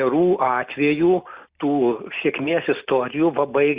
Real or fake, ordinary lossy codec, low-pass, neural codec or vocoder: real; Opus, 64 kbps; 3.6 kHz; none